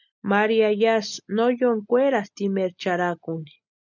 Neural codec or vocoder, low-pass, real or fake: none; 7.2 kHz; real